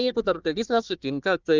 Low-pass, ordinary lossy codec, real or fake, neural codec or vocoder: 7.2 kHz; Opus, 24 kbps; fake; codec, 16 kHz, 1 kbps, FunCodec, trained on Chinese and English, 50 frames a second